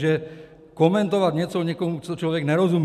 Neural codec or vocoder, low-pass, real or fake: none; 14.4 kHz; real